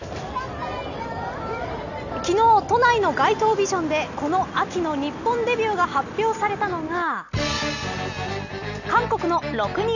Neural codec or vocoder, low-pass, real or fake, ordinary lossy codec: none; 7.2 kHz; real; none